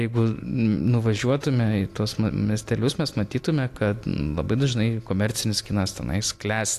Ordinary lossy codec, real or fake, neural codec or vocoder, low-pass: AAC, 64 kbps; real; none; 14.4 kHz